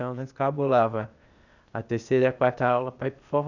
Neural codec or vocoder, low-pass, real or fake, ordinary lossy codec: codec, 16 kHz, 0.8 kbps, ZipCodec; 7.2 kHz; fake; none